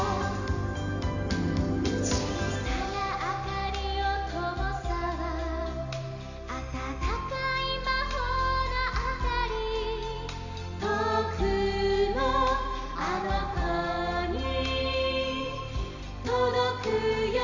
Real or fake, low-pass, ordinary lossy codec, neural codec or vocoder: real; 7.2 kHz; none; none